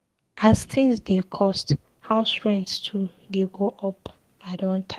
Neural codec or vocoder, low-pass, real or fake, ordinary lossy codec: codec, 32 kHz, 1.9 kbps, SNAC; 14.4 kHz; fake; Opus, 24 kbps